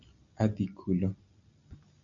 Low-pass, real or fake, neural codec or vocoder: 7.2 kHz; real; none